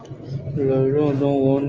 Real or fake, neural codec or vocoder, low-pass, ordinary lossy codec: real; none; 7.2 kHz; Opus, 24 kbps